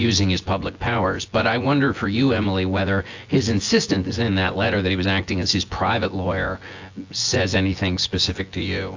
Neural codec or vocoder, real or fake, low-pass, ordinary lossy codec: vocoder, 24 kHz, 100 mel bands, Vocos; fake; 7.2 kHz; AAC, 48 kbps